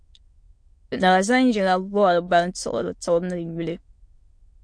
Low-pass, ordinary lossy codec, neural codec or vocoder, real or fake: 9.9 kHz; MP3, 48 kbps; autoencoder, 22.05 kHz, a latent of 192 numbers a frame, VITS, trained on many speakers; fake